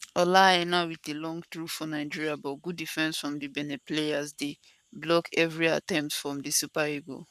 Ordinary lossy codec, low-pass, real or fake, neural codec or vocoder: none; 14.4 kHz; fake; codec, 44.1 kHz, 7.8 kbps, Pupu-Codec